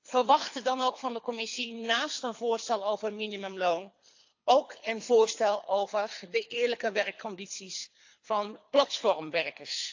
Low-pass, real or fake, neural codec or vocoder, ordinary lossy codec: 7.2 kHz; fake; codec, 24 kHz, 3 kbps, HILCodec; AAC, 48 kbps